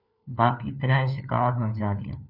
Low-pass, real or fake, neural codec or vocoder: 5.4 kHz; fake; codec, 16 kHz, 4 kbps, FunCodec, trained on LibriTTS, 50 frames a second